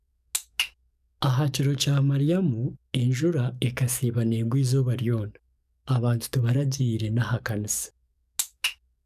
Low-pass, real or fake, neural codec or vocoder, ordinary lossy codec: 14.4 kHz; fake; codec, 44.1 kHz, 7.8 kbps, DAC; none